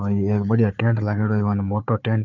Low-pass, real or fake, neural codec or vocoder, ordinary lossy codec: 7.2 kHz; fake; codec, 16 kHz, 4 kbps, FunCodec, trained on Chinese and English, 50 frames a second; none